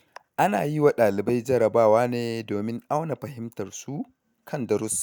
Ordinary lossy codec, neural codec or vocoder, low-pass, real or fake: none; none; none; real